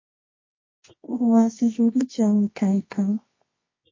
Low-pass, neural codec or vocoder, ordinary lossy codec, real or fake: 7.2 kHz; codec, 24 kHz, 0.9 kbps, WavTokenizer, medium music audio release; MP3, 32 kbps; fake